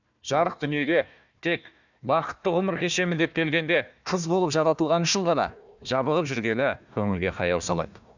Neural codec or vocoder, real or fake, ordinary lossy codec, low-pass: codec, 16 kHz, 1 kbps, FunCodec, trained on Chinese and English, 50 frames a second; fake; none; 7.2 kHz